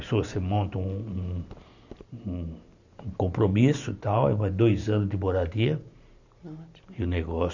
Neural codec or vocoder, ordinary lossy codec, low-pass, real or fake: vocoder, 44.1 kHz, 128 mel bands every 512 samples, BigVGAN v2; none; 7.2 kHz; fake